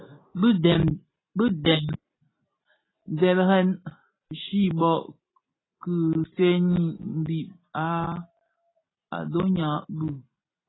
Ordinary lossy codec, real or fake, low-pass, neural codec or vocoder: AAC, 16 kbps; real; 7.2 kHz; none